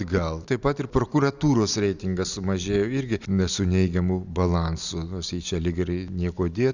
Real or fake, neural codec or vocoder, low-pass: real; none; 7.2 kHz